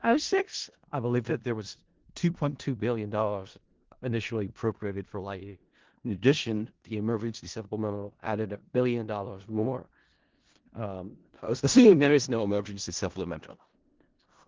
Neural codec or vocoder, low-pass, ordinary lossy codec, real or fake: codec, 16 kHz in and 24 kHz out, 0.4 kbps, LongCat-Audio-Codec, four codebook decoder; 7.2 kHz; Opus, 16 kbps; fake